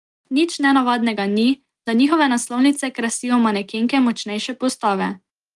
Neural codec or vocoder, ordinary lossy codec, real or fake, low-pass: none; Opus, 24 kbps; real; 10.8 kHz